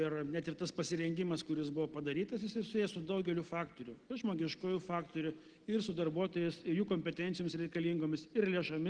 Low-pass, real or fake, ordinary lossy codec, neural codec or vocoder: 9.9 kHz; real; Opus, 16 kbps; none